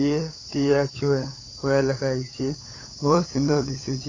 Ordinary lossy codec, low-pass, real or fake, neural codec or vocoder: AAC, 32 kbps; 7.2 kHz; fake; codec, 16 kHz, 8 kbps, FunCodec, trained on LibriTTS, 25 frames a second